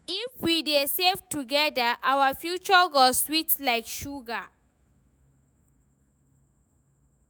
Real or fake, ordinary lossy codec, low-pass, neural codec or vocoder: fake; none; none; autoencoder, 48 kHz, 128 numbers a frame, DAC-VAE, trained on Japanese speech